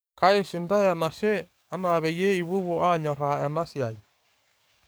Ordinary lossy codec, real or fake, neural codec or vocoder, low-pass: none; fake; codec, 44.1 kHz, 7.8 kbps, DAC; none